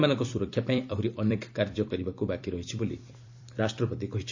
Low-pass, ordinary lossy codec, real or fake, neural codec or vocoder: 7.2 kHz; AAC, 48 kbps; fake; vocoder, 44.1 kHz, 128 mel bands every 256 samples, BigVGAN v2